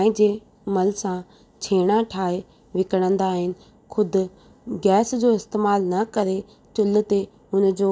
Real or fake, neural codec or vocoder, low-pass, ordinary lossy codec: real; none; none; none